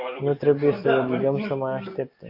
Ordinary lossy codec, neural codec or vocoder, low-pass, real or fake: AAC, 32 kbps; none; 5.4 kHz; real